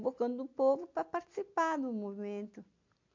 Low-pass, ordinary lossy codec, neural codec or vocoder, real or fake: 7.2 kHz; MP3, 48 kbps; none; real